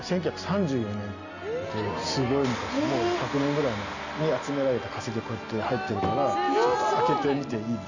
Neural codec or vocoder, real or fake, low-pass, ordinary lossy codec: none; real; 7.2 kHz; none